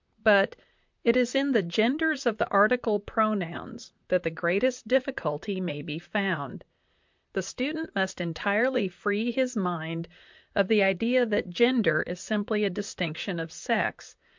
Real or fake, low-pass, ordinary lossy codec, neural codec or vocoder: fake; 7.2 kHz; MP3, 64 kbps; vocoder, 44.1 kHz, 128 mel bands, Pupu-Vocoder